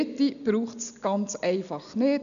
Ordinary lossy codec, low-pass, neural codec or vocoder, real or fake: none; 7.2 kHz; none; real